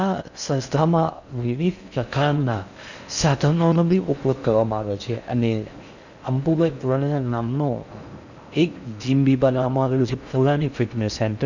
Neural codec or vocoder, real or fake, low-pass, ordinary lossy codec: codec, 16 kHz in and 24 kHz out, 0.6 kbps, FocalCodec, streaming, 4096 codes; fake; 7.2 kHz; none